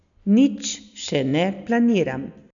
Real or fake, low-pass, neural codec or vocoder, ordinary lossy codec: real; 7.2 kHz; none; none